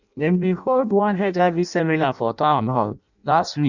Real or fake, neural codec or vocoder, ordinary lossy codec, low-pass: fake; codec, 16 kHz in and 24 kHz out, 0.6 kbps, FireRedTTS-2 codec; none; 7.2 kHz